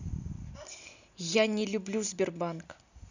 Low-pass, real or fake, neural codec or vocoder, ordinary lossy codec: 7.2 kHz; real; none; none